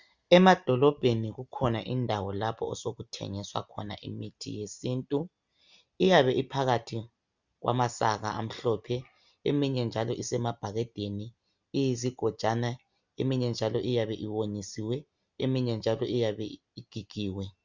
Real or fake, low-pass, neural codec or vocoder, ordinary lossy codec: real; 7.2 kHz; none; Opus, 64 kbps